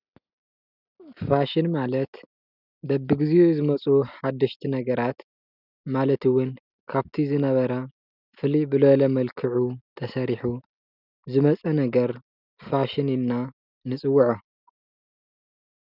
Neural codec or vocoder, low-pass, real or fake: none; 5.4 kHz; real